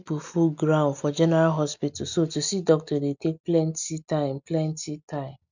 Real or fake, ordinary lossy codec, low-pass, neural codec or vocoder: real; none; 7.2 kHz; none